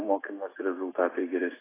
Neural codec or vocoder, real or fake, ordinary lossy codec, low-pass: autoencoder, 48 kHz, 128 numbers a frame, DAC-VAE, trained on Japanese speech; fake; AAC, 16 kbps; 3.6 kHz